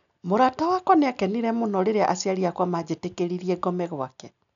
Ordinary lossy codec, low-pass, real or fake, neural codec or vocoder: none; 7.2 kHz; real; none